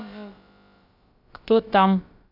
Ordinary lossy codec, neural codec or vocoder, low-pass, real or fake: none; codec, 16 kHz, about 1 kbps, DyCAST, with the encoder's durations; 5.4 kHz; fake